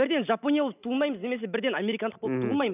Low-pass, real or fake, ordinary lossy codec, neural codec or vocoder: 3.6 kHz; real; none; none